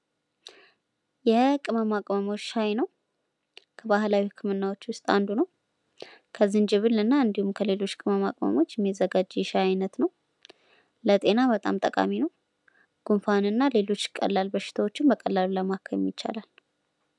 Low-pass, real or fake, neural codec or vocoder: 10.8 kHz; real; none